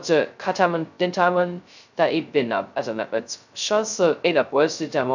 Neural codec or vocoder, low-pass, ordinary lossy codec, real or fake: codec, 16 kHz, 0.2 kbps, FocalCodec; 7.2 kHz; none; fake